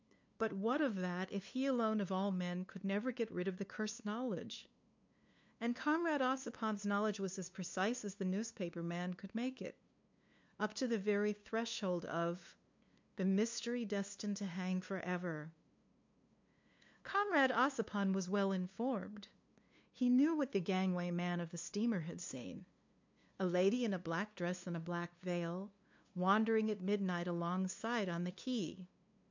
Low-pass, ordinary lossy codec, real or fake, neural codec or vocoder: 7.2 kHz; MP3, 64 kbps; fake; codec, 16 kHz, 2 kbps, FunCodec, trained on LibriTTS, 25 frames a second